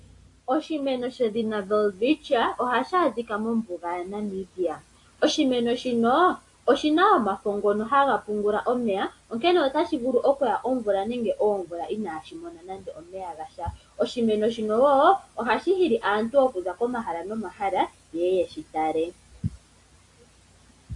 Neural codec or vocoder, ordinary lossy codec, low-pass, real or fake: none; AAC, 48 kbps; 10.8 kHz; real